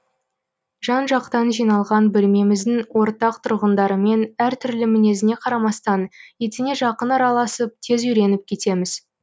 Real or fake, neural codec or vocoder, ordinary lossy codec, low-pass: real; none; none; none